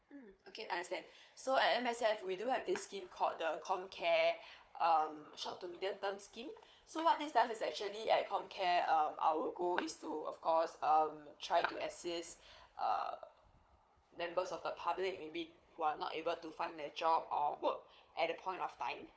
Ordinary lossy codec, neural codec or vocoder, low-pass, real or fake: none; codec, 16 kHz, 4 kbps, FunCodec, trained on Chinese and English, 50 frames a second; none; fake